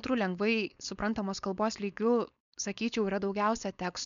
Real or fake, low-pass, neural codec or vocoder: fake; 7.2 kHz; codec, 16 kHz, 4.8 kbps, FACodec